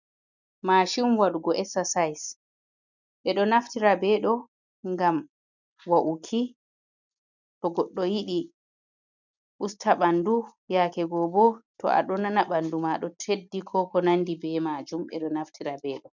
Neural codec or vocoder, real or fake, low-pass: none; real; 7.2 kHz